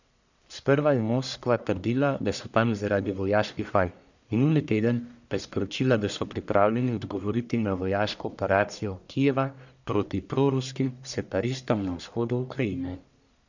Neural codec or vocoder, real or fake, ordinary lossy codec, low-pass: codec, 44.1 kHz, 1.7 kbps, Pupu-Codec; fake; none; 7.2 kHz